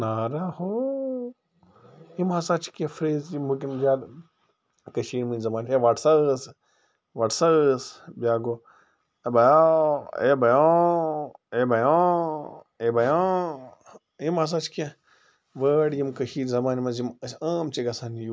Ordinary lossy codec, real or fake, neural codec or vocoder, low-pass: none; real; none; none